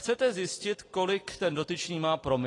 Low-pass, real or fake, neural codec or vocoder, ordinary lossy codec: 10.8 kHz; real; none; AAC, 32 kbps